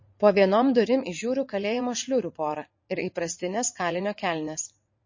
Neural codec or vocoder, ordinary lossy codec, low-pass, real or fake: vocoder, 44.1 kHz, 80 mel bands, Vocos; MP3, 32 kbps; 7.2 kHz; fake